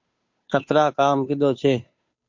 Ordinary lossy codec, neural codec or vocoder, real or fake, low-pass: MP3, 48 kbps; codec, 16 kHz, 2 kbps, FunCodec, trained on Chinese and English, 25 frames a second; fake; 7.2 kHz